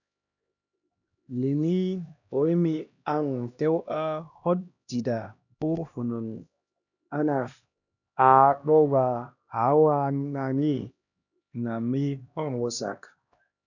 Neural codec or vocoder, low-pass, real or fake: codec, 16 kHz, 1 kbps, X-Codec, HuBERT features, trained on LibriSpeech; 7.2 kHz; fake